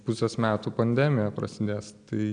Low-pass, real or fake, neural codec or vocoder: 9.9 kHz; real; none